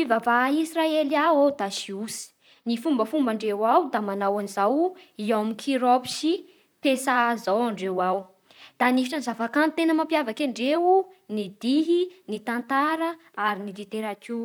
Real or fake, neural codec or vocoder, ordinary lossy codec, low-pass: fake; vocoder, 44.1 kHz, 128 mel bands, Pupu-Vocoder; none; none